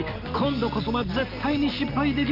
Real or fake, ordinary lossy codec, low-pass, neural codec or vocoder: real; Opus, 24 kbps; 5.4 kHz; none